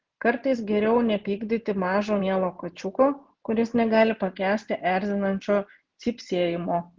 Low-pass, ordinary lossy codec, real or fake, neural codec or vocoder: 7.2 kHz; Opus, 16 kbps; real; none